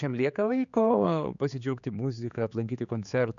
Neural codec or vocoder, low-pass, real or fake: codec, 16 kHz, 4 kbps, X-Codec, HuBERT features, trained on general audio; 7.2 kHz; fake